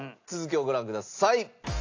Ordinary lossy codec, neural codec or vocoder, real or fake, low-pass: AAC, 48 kbps; none; real; 7.2 kHz